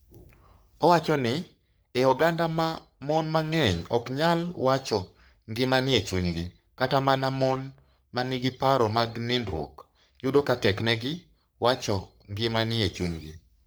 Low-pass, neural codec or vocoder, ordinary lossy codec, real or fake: none; codec, 44.1 kHz, 3.4 kbps, Pupu-Codec; none; fake